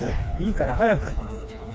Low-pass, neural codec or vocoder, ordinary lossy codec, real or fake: none; codec, 16 kHz, 2 kbps, FreqCodec, smaller model; none; fake